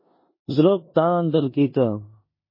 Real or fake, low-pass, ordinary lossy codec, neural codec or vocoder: fake; 5.4 kHz; MP3, 24 kbps; codec, 16 kHz in and 24 kHz out, 0.9 kbps, LongCat-Audio-Codec, four codebook decoder